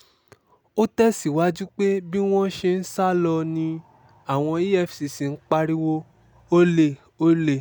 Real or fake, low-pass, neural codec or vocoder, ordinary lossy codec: real; none; none; none